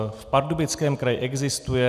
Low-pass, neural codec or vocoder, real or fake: 14.4 kHz; none; real